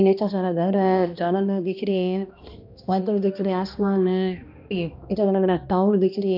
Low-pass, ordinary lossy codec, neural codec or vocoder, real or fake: 5.4 kHz; none; codec, 16 kHz, 1 kbps, X-Codec, HuBERT features, trained on balanced general audio; fake